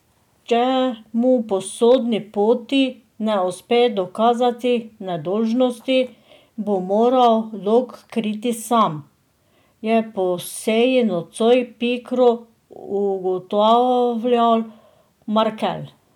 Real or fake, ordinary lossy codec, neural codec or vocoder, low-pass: real; none; none; 19.8 kHz